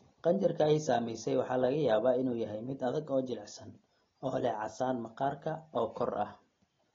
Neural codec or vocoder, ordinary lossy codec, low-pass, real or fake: none; AAC, 32 kbps; 7.2 kHz; real